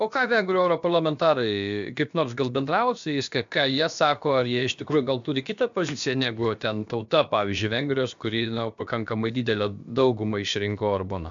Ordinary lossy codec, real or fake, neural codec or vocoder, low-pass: MP3, 64 kbps; fake; codec, 16 kHz, about 1 kbps, DyCAST, with the encoder's durations; 7.2 kHz